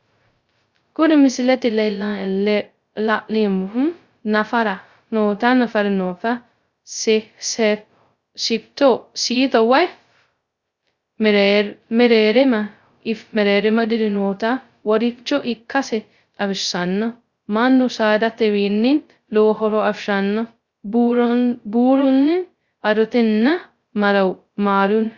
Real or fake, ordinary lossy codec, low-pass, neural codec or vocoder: fake; Opus, 64 kbps; 7.2 kHz; codec, 16 kHz, 0.2 kbps, FocalCodec